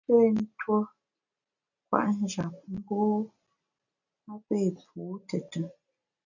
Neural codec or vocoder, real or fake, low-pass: none; real; 7.2 kHz